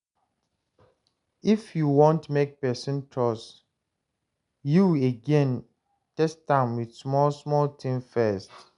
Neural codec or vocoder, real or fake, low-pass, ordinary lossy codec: none; real; 10.8 kHz; none